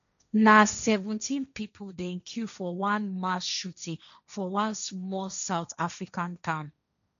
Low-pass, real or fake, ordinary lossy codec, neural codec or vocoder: 7.2 kHz; fake; none; codec, 16 kHz, 1.1 kbps, Voila-Tokenizer